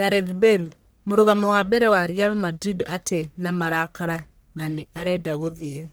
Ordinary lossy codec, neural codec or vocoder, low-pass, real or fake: none; codec, 44.1 kHz, 1.7 kbps, Pupu-Codec; none; fake